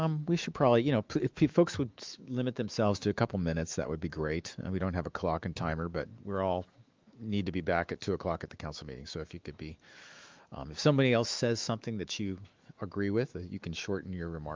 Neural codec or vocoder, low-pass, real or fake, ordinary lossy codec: autoencoder, 48 kHz, 128 numbers a frame, DAC-VAE, trained on Japanese speech; 7.2 kHz; fake; Opus, 24 kbps